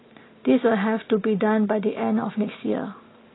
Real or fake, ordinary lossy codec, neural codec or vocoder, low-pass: real; AAC, 16 kbps; none; 7.2 kHz